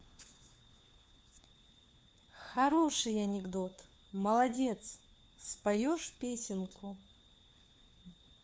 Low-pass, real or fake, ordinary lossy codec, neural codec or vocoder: none; fake; none; codec, 16 kHz, 4 kbps, FunCodec, trained on LibriTTS, 50 frames a second